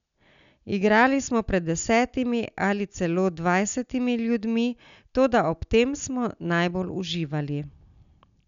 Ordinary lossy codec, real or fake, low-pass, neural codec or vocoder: none; real; 7.2 kHz; none